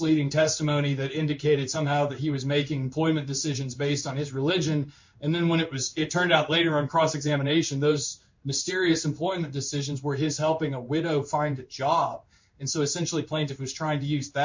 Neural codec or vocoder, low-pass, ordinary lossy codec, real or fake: codec, 16 kHz in and 24 kHz out, 1 kbps, XY-Tokenizer; 7.2 kHz; MP3, 48 kbps; fake